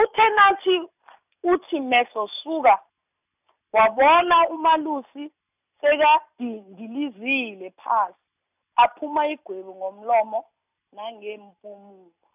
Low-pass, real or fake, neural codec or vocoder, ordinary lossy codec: 3.6 kHz; real; none; none